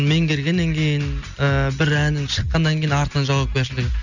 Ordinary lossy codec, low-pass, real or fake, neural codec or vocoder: none; 7.2 kHz; real; none